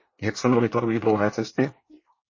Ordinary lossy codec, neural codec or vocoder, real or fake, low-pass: MP3, 32 kbps; codec, 24 kHz, 1 kbps, SNAC; fake; 7.2 kHz